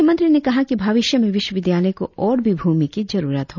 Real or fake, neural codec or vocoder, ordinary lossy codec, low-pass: real; none; none; 7.2 kHz